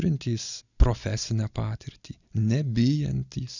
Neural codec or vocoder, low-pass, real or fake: none; 7.2 kHz; real